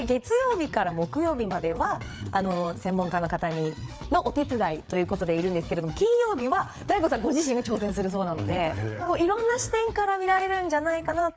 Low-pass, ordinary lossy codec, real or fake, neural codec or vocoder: none; none; fake; codec, 16 kHz, 4 kbps, FreqCodec, larger model